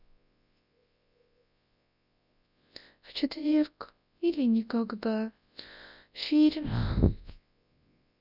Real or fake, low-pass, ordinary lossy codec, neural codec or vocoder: fake; 5.4 kHz; none; codec, 24 kHz, 0.9 kbps, WavTokenizer, large speech release